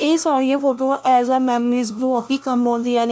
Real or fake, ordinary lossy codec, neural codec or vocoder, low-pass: fake; none; codec, 16 kHz, 0.5 kbps, FunCodec, trained on LibriTTS, 25 frames a second; none